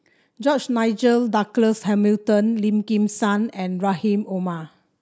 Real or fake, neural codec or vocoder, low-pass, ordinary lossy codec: real; none; none; none